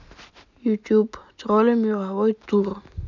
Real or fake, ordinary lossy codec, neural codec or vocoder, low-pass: real; none; none; 7.2 kHz